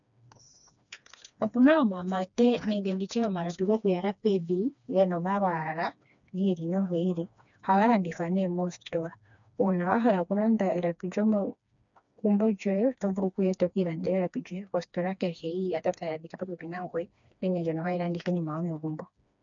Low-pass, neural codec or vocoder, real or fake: 7.2 kHz; codec, 16 kHz, 2 kbps, FreqCodec, smaller model; fake